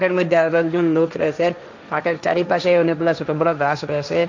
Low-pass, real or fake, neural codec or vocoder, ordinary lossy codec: 7.2 kHz; fake; codec, 16 kHz, 1.1 kbps, Voila-Tokenizer; none